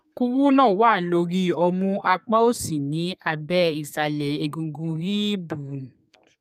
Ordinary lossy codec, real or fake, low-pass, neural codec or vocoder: none; fake; 14.4 kHz; codec, 32 kHz, 1.9 kbps, SNAC